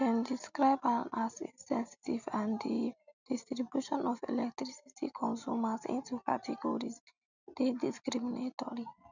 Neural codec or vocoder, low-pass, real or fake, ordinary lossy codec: none; 7.2 kHz; real; none